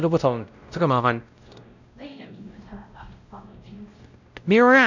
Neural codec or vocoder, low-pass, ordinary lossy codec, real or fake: codec, 16 kHz, 0.5 kbps, X-Codec, WavLM features, trained on Multilingual LibriSpeech; 7.2 kHz; none; fake